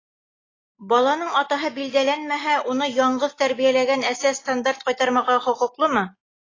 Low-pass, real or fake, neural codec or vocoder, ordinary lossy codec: 7.2 kHz; real; none; AAC, 32 kbps